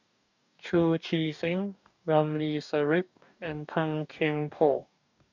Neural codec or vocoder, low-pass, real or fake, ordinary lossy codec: codec, 44.1 kHz, 2.6 kbps, DAC; 7.2 kHz; fake; none